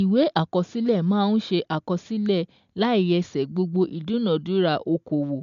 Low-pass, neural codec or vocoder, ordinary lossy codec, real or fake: 7.2 kHz; none; MP3, 64 kbps; real